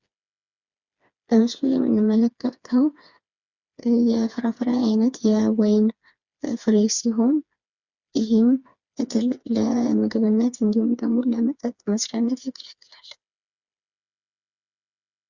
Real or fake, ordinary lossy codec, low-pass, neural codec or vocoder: fake; Opus, 64 kbps; 7.2 kHz; codec, 16 kHz, 4 kbps, FreqCodec, smaller model